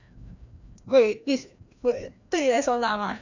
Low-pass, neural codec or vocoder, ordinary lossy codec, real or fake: 7.2 kHz; codec, 16 kHz, 1 kbps, FreqCodec, larger model; none; fake